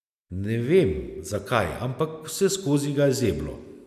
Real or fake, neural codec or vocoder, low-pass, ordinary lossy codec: real; none; 14.4 kHz; none